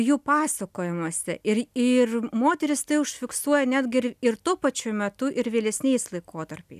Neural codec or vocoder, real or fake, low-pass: none; real; 14.4 kHz